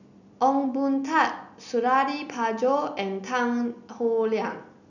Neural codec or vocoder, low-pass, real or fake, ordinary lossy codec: none; 7.2 kHz; real; none